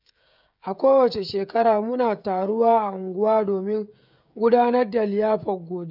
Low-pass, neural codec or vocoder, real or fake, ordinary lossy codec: 5.4 kHz; codec, 16 kHz, 16 kbps, FreqCodec, smaller model; fake; none